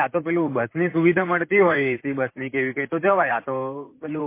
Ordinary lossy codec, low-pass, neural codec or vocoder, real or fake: MP3, 24 kbps; 3.6 kHz; vocoder, 44.1 kHz, 128 mel bands, Pupu-Vocoder; fake